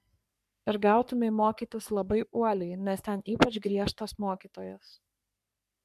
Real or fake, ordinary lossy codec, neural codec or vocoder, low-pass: fake; MP3, 96 kbps; codec, 44.1 kHz, 3.4 kbps, Pupu-Codec; 14.4 kHz